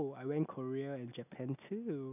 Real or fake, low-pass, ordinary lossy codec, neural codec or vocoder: real; 3.6 kHz; none; none